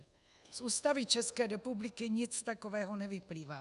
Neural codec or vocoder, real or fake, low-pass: codec, 24 kHz, 1.2 kbps, DualCodec; fake; 10.8 kHz